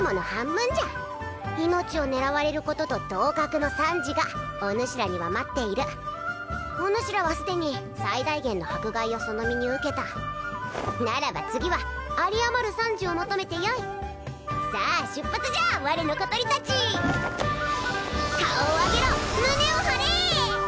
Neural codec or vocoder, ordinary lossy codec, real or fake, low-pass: none; none; real; none